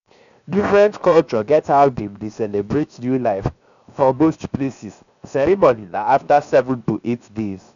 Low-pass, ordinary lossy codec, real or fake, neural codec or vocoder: 7.2 kHz; none; fake; codec, 16 kHz, 0.7 kbps, FocalCodec